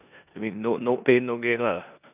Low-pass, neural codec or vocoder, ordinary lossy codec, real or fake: 3.6 kHz; codec, 16 kHz in and 24 kHz out, 0.9 kbps, LongCat-Audio-Codec, four codebook decoder; none; fake